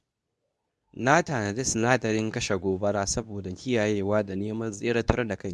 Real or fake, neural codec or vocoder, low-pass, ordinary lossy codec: fake; codec, 24 kHz, 0.9 kbps, WavTokenizer, medium speech release version 2; none; none